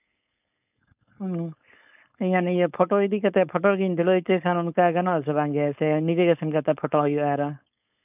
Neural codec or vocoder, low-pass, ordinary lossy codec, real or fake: codec, 16 kHz, 4.8 kbps, FACodec; 3.6 kHz; none; fake